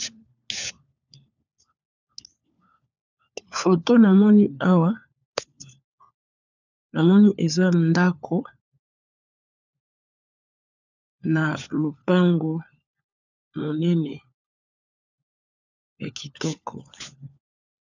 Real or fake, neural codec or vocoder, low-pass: fake; codec, 16 kHz, 4 kbps, FunCodec, trained on LibriTTS, 50 frames a second; 7.2 kHz